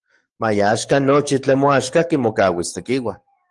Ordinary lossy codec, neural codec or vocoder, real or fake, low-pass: Opus, 24 kbps; codec, 44.1 kHz, 7.8 kbps, DAC; fake; 10.8 kHz